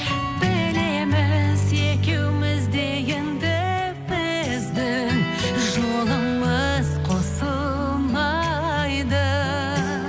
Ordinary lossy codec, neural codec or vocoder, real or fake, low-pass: none; none; real; none